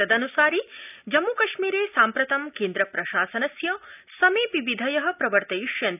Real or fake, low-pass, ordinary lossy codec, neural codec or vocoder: real; 3.6 kHz; none; none